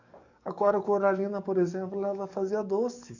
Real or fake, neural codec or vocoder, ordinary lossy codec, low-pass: fake; codec, 44.1 kHz, 7.8 kbps, DAC; none; 7.2 kHz